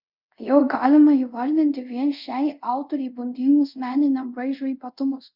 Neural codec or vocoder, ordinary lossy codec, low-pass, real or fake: codec, 24 kHz, 0.5 kbps, DualCodec; Opus, 64 kbps; 5.4 kHz; fake